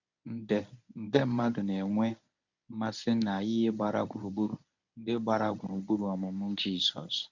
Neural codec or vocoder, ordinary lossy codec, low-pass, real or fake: codec, 24 kHz, 0.9 kbps, WavTokenizer, medium speech release version 1; none; 7.2 kHz; fake